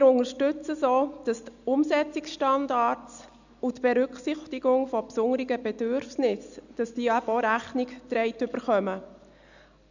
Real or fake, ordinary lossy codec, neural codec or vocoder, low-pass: real; none; none; 7.2 kHz